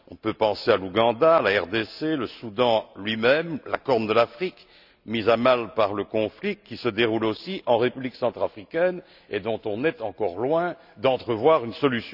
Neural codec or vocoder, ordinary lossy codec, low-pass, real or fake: none; none; 5.4 kHz; real